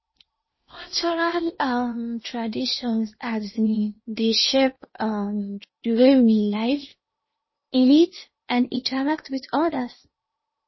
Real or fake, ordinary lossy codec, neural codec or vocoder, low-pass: fake; MP3, 24 kbps; codec, 16 kHz in and 24 kHz out, 0.8 kbps, FocalCodec, streaming, 65536 codes; 7.2 kHz